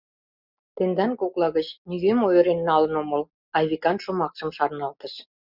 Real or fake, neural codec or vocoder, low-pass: real; none; 5.4 kHz